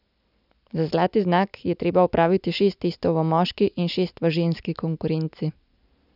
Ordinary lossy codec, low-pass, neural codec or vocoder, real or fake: none; 5.4 kHz; none; real